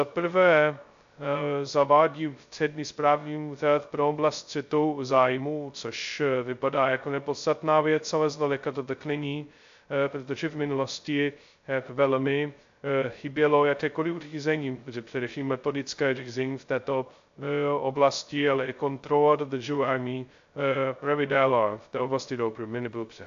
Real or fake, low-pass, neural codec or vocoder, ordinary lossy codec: fake; 7.2 kHz; codec, 16 kHz, 0.2 kbps, FocalCodec; AAC, 64 kbps